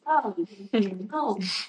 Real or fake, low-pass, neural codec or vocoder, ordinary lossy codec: fake; 9.9 kHz; vocoder, 44.1 kHz, 128 mel bands, Pupu-Vocoder; MP3, 96 kbps